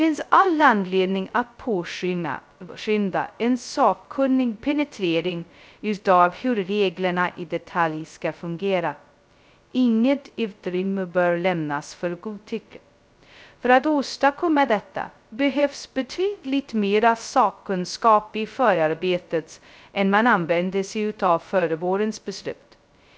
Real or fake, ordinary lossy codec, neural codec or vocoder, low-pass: fake; none; codec, 16 kHz, 0.2 kbps, FocalCodec; none